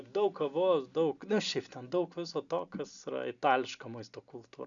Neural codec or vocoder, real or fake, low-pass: none; real; 7.2 kHz